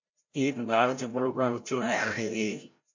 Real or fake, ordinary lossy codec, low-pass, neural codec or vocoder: fake; MP3, 48 kbps; 7.2 kHz; codec, 16 kHz, 0.5 kbps, FreqCodec, larger model